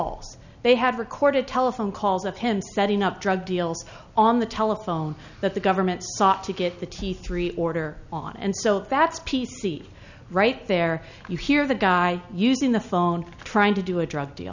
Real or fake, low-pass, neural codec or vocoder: real; 7.2 kHz; none